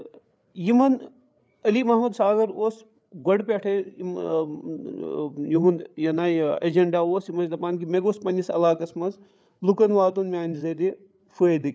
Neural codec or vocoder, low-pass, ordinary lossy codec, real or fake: codec, 16 kHz, 8 kbps, FreqCodec, larger model; none; none; fake